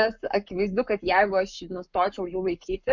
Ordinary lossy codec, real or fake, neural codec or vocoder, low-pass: MP3, 64 kbps; fake; codec, 44.1 kHz, 7.8 kbps, Pupu-Codec; 7.2 kHz